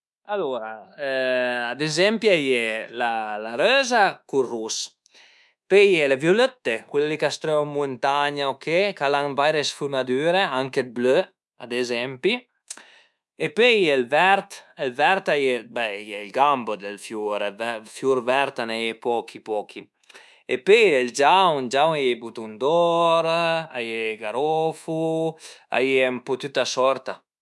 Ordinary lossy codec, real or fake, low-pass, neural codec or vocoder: none; fake; none; codec, 24 kHz, 1.2 kbps, DualCodec